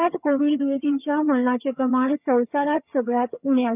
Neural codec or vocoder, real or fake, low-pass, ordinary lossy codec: vocoder, 22.05 kHz, 80 mel bands, HiFi-GAN; fake; 3.6 kHz; none